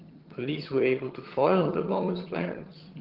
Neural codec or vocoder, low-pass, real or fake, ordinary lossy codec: vocoder, 22.05 kHz, 80 mel bands, HiFi-GAN; 5.4 kHz; fake; Opus, 16 kbps